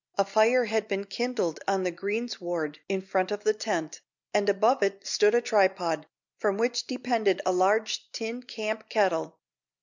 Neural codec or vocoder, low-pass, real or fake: none; 7.2 kHz; real